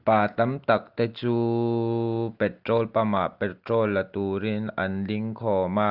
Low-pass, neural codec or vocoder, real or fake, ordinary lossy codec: 5.4 kHz; none; real; Opus, 32 kbps